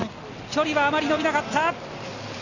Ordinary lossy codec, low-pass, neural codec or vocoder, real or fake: none; 7.2 kHz; none; real